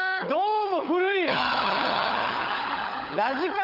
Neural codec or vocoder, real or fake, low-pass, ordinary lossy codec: codec, 16 kHz, 16 kbps, FunCodec, trained on LibriTTS, 50 frames a second; fake; 5.4 kHz; none